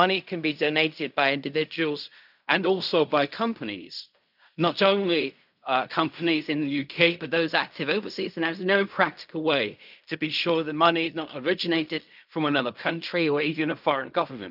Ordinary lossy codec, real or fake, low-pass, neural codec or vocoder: none; fake; 5.4 kHz; codec, 16 kHz in and 24 kHz out, 0.4 kbps, LongCat-Audio-Codec, fine tuned four codebook decoder